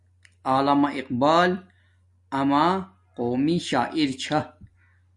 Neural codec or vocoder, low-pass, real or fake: none; 10.8 kHz; real